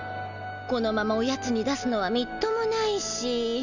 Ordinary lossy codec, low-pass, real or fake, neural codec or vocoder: none; 7.2 kHz; real; none